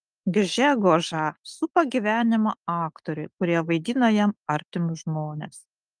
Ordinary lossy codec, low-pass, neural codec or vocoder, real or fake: Opus, 32 kbps; 9.9 kHz; none; real